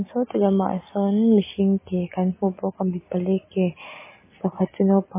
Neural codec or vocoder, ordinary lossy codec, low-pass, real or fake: none; MP3, 16 kbps; 3.6 kHz; real